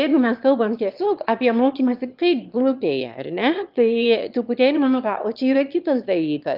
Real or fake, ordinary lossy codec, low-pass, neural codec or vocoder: fake; Opus, 24 kbps; 5.4 kHz; autoencoder, 22.05 kHz, a latent of 192 numbers a frame, VITS, trained on one speaker